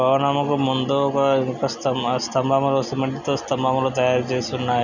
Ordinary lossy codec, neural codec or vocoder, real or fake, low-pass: none; none; real; 7.2 kHz